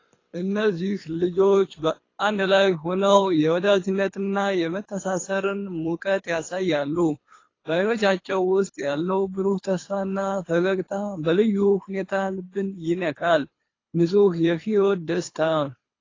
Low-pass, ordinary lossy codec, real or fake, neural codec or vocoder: 7.2 kHz; AAC, 32 kbps; fake; codec, 24 kHz, 3 kbps, HILCodec